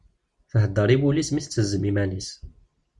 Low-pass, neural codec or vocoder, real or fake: 10.8 kHz; none; real